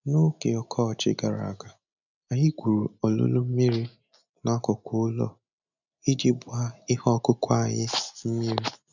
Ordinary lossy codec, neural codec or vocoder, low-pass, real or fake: none; none; 7.2 kHz; real